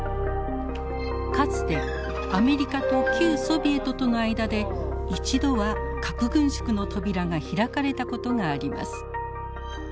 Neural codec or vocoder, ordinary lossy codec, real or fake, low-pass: none; none; real; none